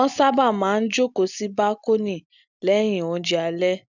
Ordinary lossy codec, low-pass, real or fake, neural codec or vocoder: none; 7.2 kHz; real; none